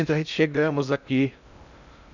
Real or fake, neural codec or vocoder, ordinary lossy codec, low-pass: fake; codec, 16 kHz in and 24 kHz out, 0.6 kbps, FocalCodec, streaming, 2048 codes; none; 7.2 kHz